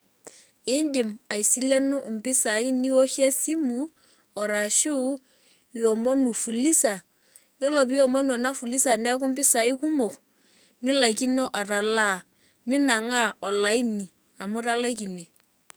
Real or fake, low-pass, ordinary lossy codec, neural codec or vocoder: fake; none; none; codec, 44.1 kHz, 2.6 kbps, SNAC